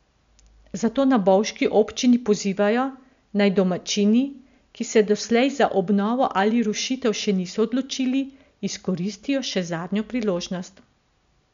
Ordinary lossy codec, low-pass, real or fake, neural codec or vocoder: MP3, 64 kbps; 7.2 kHz; real; none